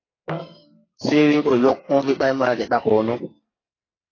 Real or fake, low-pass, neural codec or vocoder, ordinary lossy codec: fake; 7.2 kHz; codec, 44.1 kHz, 2.6 kbps, SNAC; AAC, 32 kbps